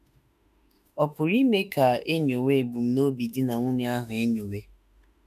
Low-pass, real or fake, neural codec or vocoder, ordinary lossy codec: 14.4 kHz; fake; autoencoder, 48 kHz, 32 numbers a frame, DAC-VAE, trained on Japanese speech; none